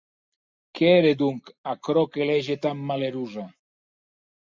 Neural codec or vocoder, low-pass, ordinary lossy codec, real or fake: none; 7.2 kHz; MP3, 64 kbps; real